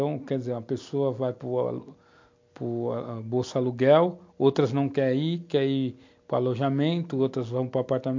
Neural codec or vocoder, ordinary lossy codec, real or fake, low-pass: none; MP3, 48 kbps; real; 7.2 kHz